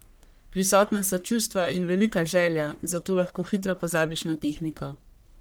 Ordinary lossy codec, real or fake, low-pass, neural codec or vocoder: none; fake; none; codec, 44.1 kHz, 1.7 kbps, Pupu-Codec